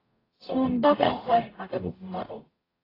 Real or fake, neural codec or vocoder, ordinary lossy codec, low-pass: fake; codec, 44.1 kHz, 0.9 kbps, DAC; AAC, 24 kbps; 5.4 kHz